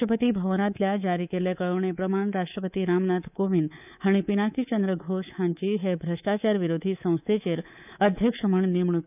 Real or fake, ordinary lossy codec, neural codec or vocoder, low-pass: fake; none; codec, 24 kHz, 3.1 kbps, DualCodec; 3.6 kHz